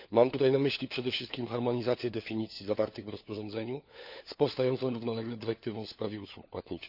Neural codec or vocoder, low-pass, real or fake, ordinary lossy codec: codec, 16 kHz, 4 kbps, FunCodec, trained on LibriTTS, 50 frames a second; 5.4 kHz; fake; none